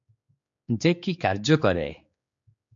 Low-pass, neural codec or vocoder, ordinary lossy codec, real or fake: 7.2 kHz; codec, 16 kHz, 4 kbps, X-Codec, HuBERT features, trained on general audio; MP3, 48 kbps; fake